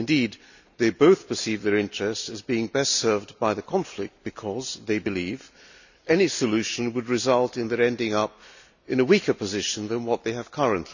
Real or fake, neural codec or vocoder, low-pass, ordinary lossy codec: real; none; 7.2 kHz; none